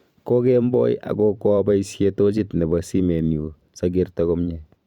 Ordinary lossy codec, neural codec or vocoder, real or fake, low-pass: Opus, 64 kbps; vocoder, 44.1 kHz, 128 mel bands every 512 samples, BigVGAN v2; fake; 19.8 kHz